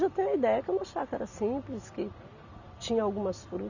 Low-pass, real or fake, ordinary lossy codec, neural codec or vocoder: 7.2 kHz; real; none; none